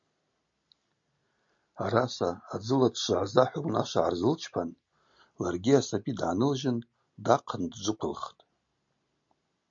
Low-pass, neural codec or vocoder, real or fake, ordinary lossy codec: 7.2 kHz; none; real; MP3, 48 kbps